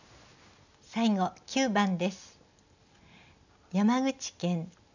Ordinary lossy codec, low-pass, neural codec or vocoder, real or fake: none; 7.2 kHz; none; real